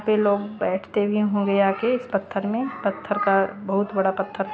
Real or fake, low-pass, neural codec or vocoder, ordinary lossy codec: real; none; none; none